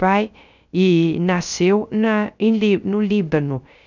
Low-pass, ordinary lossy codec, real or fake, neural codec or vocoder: 7.2 kHz; none; fake; codec, 16 kHz, 0.3 kbps, FocalCodec